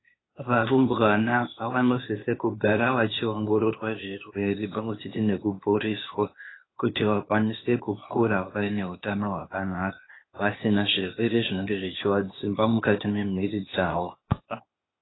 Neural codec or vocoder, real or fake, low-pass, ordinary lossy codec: codec, 16 kHz, 0.8 kbps, ZipCodec; fake; 7.2 kHz; AAC, 16 kbps